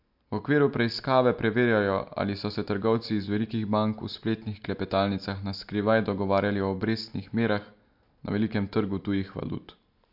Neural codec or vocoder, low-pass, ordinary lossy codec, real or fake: none; 5.4 kHz; MP3, 48 kbps; real